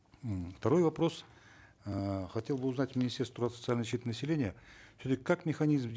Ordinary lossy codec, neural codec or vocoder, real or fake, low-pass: none; none; real; none